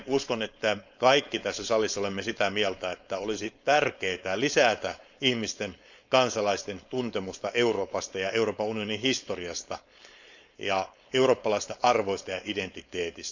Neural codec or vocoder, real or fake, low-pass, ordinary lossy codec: codec, 16 kHz, 4.8 kbps, FACodec; fake; 7.2 kHz; none